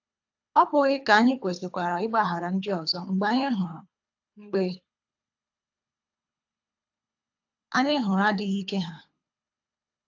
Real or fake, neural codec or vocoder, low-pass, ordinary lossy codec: fake; codec, 24 kHz, 3 kbps, HILCodec; 7.2 kHz; AAC, 48 kbps